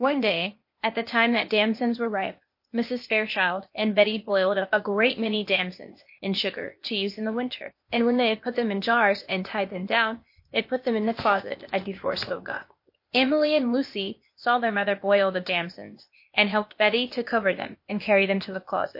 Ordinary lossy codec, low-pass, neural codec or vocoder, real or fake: MP3, 32 kbps; 5.4 kHz; codec, 16 kHz, 0.8 kbps, ZipCodec; fake